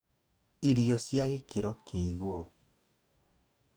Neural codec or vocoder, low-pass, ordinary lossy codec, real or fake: codec, 44.1 kHz, 2.6 kbps, DAC; none; none; fake